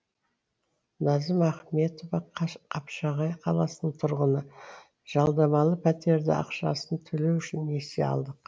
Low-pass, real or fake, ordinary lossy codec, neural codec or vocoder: none; real; none; none